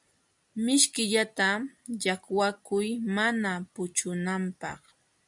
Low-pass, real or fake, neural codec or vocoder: 10.8 kHz; real; none